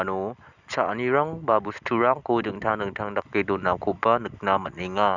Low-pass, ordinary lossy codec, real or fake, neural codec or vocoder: 7.2 kHz; none; real; none